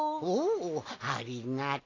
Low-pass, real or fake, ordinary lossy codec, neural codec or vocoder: 7.2 kHz; real; AAC, 32 kbps; none